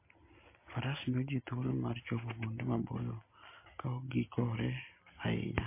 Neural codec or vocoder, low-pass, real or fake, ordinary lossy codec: none; 3.6 kHz; real; MP3, 24 kbps